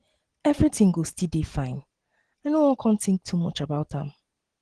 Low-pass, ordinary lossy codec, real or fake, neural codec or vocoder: 9.9 kHz; Opus, 16 kbps; real; none